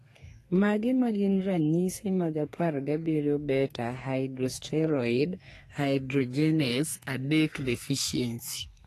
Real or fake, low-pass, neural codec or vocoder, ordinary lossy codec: fake; 14.4 kHz; codec, 32 kHz, 1.9 kbps, SNAC; AAC, 48 kbps